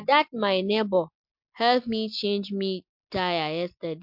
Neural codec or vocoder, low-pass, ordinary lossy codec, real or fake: none; 5.4 kHz; MP3, 48 kbps; real